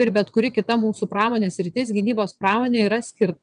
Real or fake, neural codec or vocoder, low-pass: fake; vocoder, 22.05 kHz, 80 mel bands, WaveNeXt; 9.9 kHz